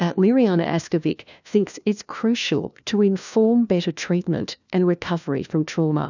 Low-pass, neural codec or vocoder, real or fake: 7.2 kHz; codec, 16 kHz, 1 kbps, FunCodec, trained on LibriTTS, 50 frames a second; fake